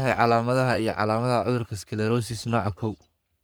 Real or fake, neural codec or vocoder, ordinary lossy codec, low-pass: fake; codec, 44.1 kHz, 3.4 kbps, Pupu-Codec; none; none